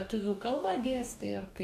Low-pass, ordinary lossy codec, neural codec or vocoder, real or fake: 14.4 kHz; AAC, 96 kbps; codec, 44.1 kHz, 2.6 kbps, DAC; fake